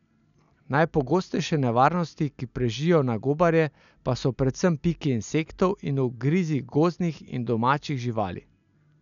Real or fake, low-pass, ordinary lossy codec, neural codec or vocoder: real; 7.2 kHz; none; none